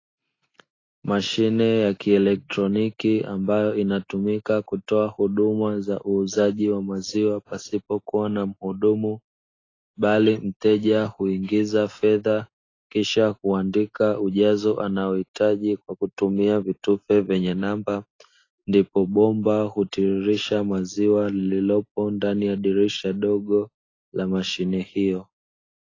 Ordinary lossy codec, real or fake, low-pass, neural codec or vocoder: AAC, 32 kbps; real; 7.2 kHz; none